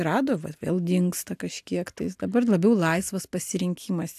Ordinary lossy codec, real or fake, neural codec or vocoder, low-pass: AAC, 96 kbps; real; none; 14.4 kHz